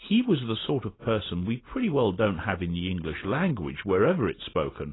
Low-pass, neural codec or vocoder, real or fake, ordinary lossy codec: 7.2 kHz; none; real; AAC, 16 kbps